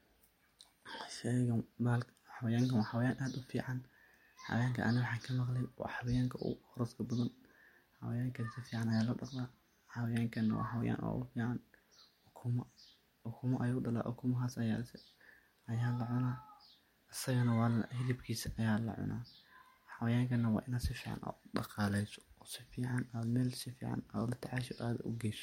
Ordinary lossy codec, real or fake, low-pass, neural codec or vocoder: MP3, 64 kbps; real; 19.8 kHz; none